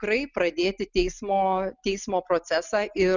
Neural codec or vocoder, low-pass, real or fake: none; 7.2 kHz; real